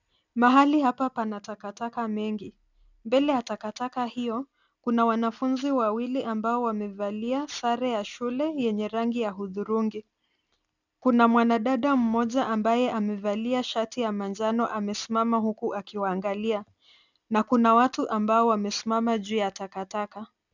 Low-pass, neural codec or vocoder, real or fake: 7.2 kHz; none; real